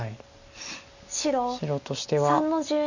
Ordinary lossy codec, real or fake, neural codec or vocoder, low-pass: none; real; none; 7.2 kHz